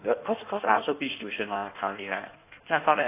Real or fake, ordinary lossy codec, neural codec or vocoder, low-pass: fake; AAC, 24 kbps; codec, 16 kHz in and 24 kHz out, 1.1 kbps, FireRedTTS-2 codec; 3.6 kHz